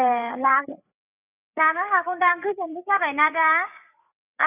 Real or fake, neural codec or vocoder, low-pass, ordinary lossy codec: fake; codec, 16 kHz, 8 kbps, FreqCodec, larger model; 3.6 kHz; none